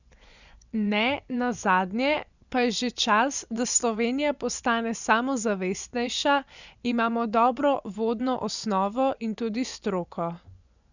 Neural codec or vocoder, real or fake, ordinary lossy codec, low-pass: vocoder, 24 kHz, 100 mel bands, Vocos; fake; none; 7.2 kHz